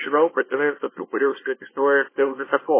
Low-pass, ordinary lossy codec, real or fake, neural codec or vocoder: 3.6 kHz; MP3, 16 kbps; fake; codec, 24 kHz, 0.9 kbps, WavTokenizer, small release